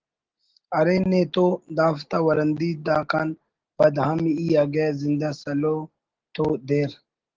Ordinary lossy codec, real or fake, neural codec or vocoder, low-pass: Opus, 16 kbps; real; none; 7.2 kHz